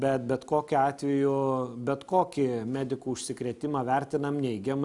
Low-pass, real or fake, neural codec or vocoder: 10.8 kHz; real; none